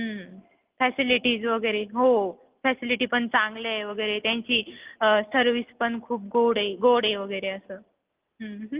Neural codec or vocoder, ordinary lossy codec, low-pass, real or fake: none; Opus, 32 kbps; 3.6 kHz; real